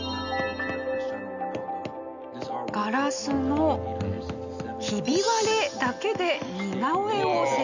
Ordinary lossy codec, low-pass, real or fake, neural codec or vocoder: none; 7.2 kHz; real; none